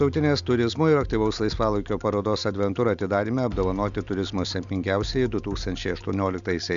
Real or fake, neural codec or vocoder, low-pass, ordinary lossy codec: real; none; 7.2 kHz; Opus, 64 kbps